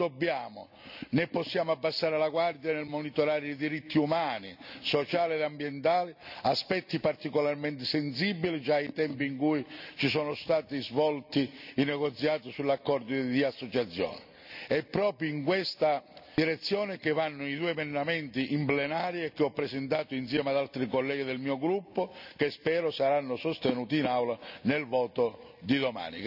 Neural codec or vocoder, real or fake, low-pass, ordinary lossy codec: none; real; 5.4 kHz; none